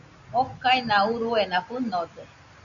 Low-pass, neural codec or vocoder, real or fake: 7.2 kHz; none; real